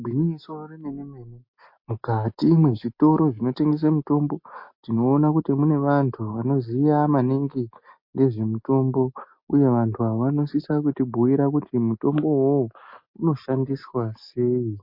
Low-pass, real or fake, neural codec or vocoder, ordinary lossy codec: 5.4 kHz; real; none; MP3, 32 kbps